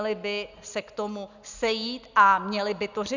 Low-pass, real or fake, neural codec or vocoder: 7.2 kHz; real; none